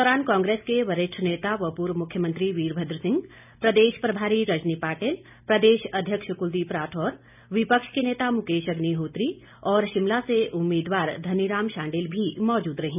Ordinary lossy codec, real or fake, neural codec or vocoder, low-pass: none; real; none; 3.6 kHz